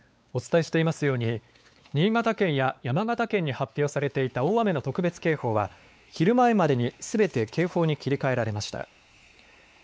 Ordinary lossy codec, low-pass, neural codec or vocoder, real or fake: none; none; codec, 16 kHz, 4 kbps, X-Codec, WavLM features, trained on Multilingual LibriSpeech; fake